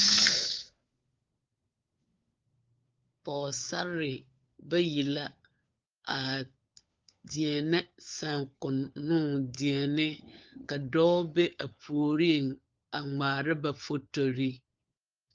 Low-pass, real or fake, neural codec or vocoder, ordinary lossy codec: 7.2 kHz; fake; codec, 16 kHz, 4 kbps, FunCodec, trained on LibriTTS, 50 frames a second; Opus, 24 kbps